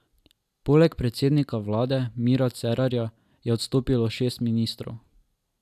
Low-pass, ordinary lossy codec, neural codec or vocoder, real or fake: 14.4 kHz; AAC, 96 kbps; none; real